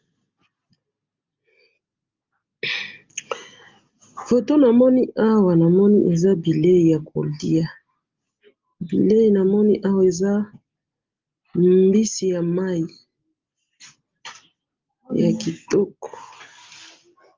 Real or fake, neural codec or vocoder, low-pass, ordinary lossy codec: real; none; 7.2 kHz; Opus, 32 kbps